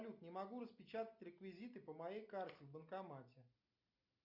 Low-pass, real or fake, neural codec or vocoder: 5.4 kHz; real; none